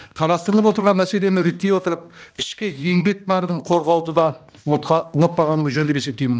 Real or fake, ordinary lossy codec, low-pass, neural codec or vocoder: fake; none; none; codec, 16 kHz, 1 kbps, X-Codec, HuBERT features, trained on balanced general audio